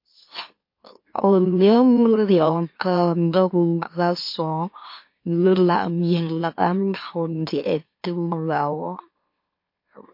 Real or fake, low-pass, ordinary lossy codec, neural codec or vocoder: fake; 5.4 kHz; MP3, 32 kbps; autoencoder, 44.1 kHz, a latent of 192 numbers a frame, MeloTTS